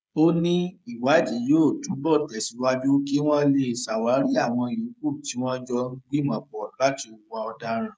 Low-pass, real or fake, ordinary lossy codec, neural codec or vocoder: none; fake; none; codec, 16 kHz, 16 kbps, FreqCodec, smaller model